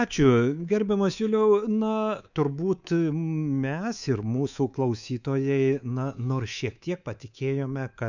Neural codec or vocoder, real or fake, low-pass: codec, 24 kHz, 3.1 kbps, DualCodec; fake; 7.2 kHz